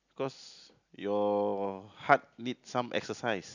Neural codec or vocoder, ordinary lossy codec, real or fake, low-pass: none; none; real; 7.2 kHz